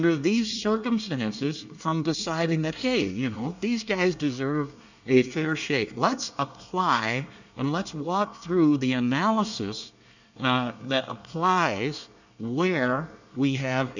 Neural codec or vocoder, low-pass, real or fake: codec, 24 kHz, 1 kbps, SNAC; 7.2 kHz; fake